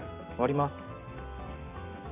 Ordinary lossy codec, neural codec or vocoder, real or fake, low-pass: none; none; real; 3.6 kHz